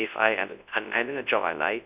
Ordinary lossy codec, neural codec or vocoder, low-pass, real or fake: Opus, 64 kbps; codec, 24 kHz, 0.9 kbps, WavTokenizer, large speech release; 3.6 kHz; fake